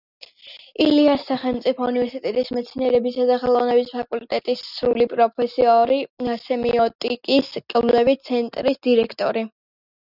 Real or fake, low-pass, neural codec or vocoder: real; 5.4 kHz; none